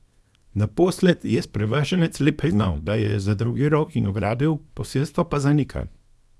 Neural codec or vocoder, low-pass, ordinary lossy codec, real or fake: codec, 24 kHz, 0.9 kbps, WavTokenizer, small release; none; none; fake